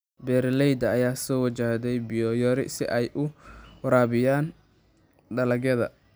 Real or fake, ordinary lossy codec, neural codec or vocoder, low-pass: real; none; none; none